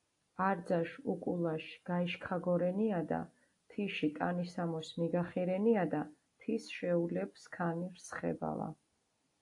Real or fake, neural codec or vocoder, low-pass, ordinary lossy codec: real; none; 10.8 kHz; AAC, 48 kbps